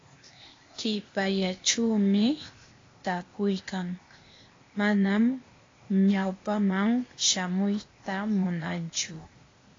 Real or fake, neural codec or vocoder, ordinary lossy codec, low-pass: fake; codec, 16 kHz, 0.8 kbps, ZipCodec; AAC, 32 kbps; 7.2 kHz